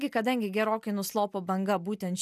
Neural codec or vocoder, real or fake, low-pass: none; real; 14.4 kHz